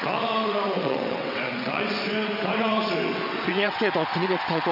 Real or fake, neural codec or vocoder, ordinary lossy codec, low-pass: fake; vocoder, 22.05 kHz, 80 mel bands, WaveNeXt; none; 5.4 kHz